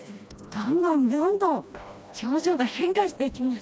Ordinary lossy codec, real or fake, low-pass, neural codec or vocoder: none; fake; none; codec, 16 kHz, 1 kbps, FreqCodec, smaller model